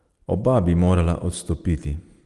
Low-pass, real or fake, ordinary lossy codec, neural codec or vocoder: 10.8 kHz; real; Opus, 24 kbps; none